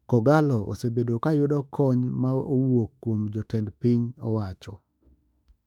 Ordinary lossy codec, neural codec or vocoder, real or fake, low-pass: none; autoencoder, 48 kHz, 32 numbers a frame, DAC-VAE, trained on Japanese speech; fake; 19.8 kHz